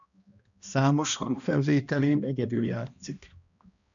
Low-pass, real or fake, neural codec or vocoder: 7.2 kHz; fake; codec, 16 kHz, 1 kbps, X-Codec, HuBERT features, trained on balanced general audio